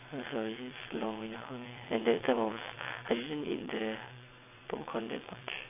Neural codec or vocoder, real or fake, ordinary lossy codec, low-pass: vocoder, 22.05 kHz, 80 mel bands, WaveNeXt; fake; AAC, 24 kbps; 3.6 kHz